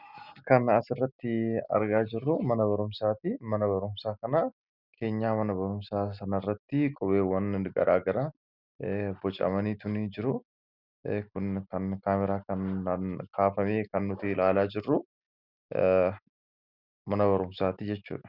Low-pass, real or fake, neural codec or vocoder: 5.4 kHz; real; none